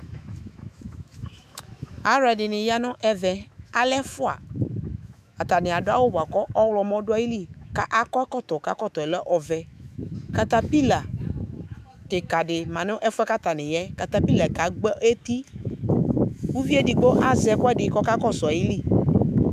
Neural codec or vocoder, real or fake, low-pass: autoencoder, 48 kHz, 128 numbers a frame, DAC-VAE, trained on Japanese speech; fake; 14.4 kHz